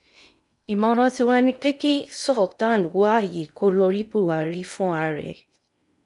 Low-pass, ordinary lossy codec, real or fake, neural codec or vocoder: 10.8 kHz; none; fake; codec, 16 kHz in and 24 kHz out, 0.8 kbps, FocalCodec, streaming, 65536 codes